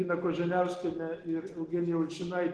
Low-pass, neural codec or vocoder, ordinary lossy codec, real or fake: 10.8 kHz; none; Opus, 16 kbps; real